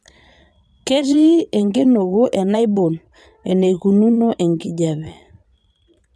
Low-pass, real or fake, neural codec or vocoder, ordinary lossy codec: none; fake; vocoder, 22.05 kHz, 80 mel bands, Vocos; none